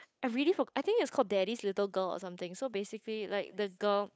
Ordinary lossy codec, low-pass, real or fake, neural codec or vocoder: none; none; fake; codec, 16 kHz, 8 kbps, FunCodec, trained on Chinese and English, 25 frames a second